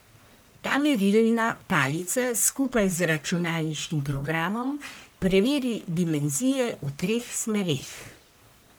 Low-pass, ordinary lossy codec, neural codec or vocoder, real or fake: none; none; codec, 44.1 kHz, 1.7 kbps, Pupu-Codec; fake